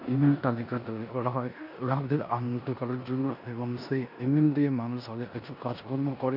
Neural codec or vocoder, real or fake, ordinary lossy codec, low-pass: codec, 16 kHz in and 24 kHz out, 0.9 kbps, LongCat-Audio-Codec, four codebook decoder; fake; none; 5.4 kHz